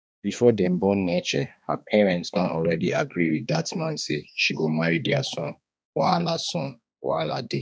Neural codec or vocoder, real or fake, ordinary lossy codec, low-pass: codec, 16 kHz, 2 kbps, X-Codec, HuBERT features, trained on balanced general audio; fake; none; none